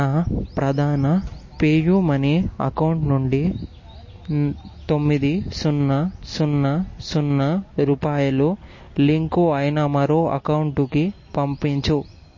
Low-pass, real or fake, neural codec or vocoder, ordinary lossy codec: 7.2 kHz; real; none; MP3, 32 kbps